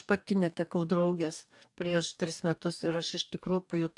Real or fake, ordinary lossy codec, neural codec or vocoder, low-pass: fake; AAC, 64 kbps; codec, 44.1 kHz, 2.6 kbps, DAC; 10.8 kHz